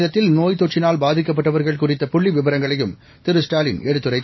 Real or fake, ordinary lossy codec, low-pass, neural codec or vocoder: real; MP3, 24 kbps; 7.2 kHz; none